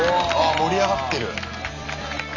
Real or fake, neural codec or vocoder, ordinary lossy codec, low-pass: real; none; AAC, 48 kbps; 7.2 kHz